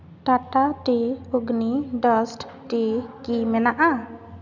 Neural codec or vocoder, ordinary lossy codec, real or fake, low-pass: none; none; real; 7.2 kHz